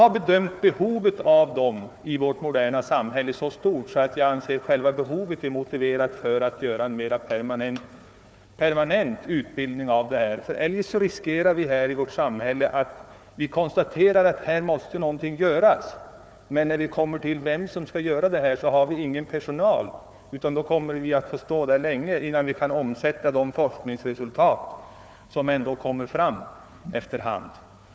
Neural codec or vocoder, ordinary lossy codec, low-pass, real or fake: codec, 16 kHz, 4 kbps, FunCodec, trained on Chinese and English, 50 frames a second; none; none; fake